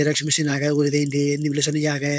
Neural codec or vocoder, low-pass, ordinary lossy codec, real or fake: codec, 16 kHz, 4.8 kbps, FACodec; none; none; fake